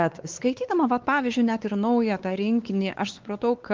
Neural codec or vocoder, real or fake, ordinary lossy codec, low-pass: codec, 16 kHz, 4 kbps, X-Codec, WavLM features, trained on Multilingual LibriSpeech; fake; Opus, 16 kbps; 7.2 kHz